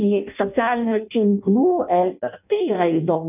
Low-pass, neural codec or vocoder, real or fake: 3.6 kHz; codec, 16 kHz in and 24 kHz out, 0.6 kbps, FireRedTTS-2 codec; fake